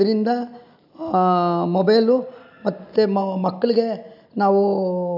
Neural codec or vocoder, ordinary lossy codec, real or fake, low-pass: none; none; real; 5.4 kHz